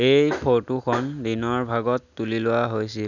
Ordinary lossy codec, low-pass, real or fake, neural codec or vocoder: none; 7.2 kHz; real; none